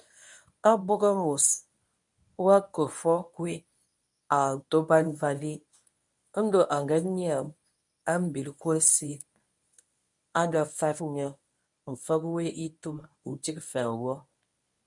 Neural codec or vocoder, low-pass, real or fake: codec, 24 kHz, 0.9 kbps, WavTokenizer, medium speech release version 1; 10.8 kHz; fake